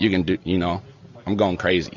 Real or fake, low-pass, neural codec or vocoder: real; 7.2 kHz; none